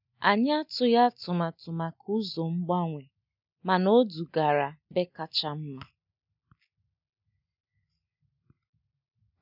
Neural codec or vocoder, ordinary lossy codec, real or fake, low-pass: none; none; real; 5.4 kHz